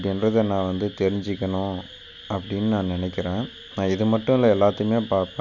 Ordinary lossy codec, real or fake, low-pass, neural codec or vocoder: none; real; 7.2 kHz; none